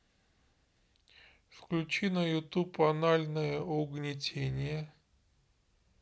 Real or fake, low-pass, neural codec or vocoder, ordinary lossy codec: real; none; none; none